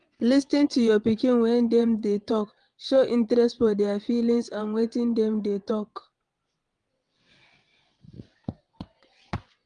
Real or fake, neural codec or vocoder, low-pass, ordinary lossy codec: fake; vocoder, 22.05 kHz, 80 mel bands, WaveNeXt; 9.9 kHz; Opus, 32 kbps